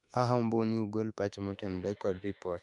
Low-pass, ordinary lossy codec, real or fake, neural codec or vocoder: 10.8 kHz; none; fake; autoencoder, 48 kHz, 32 numbers a frame, DAC-VAE, trained on Japanese speech